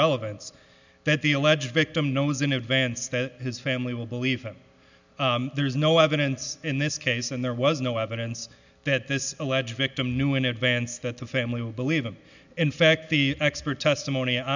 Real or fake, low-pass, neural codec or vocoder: real; 7.2 kHz; none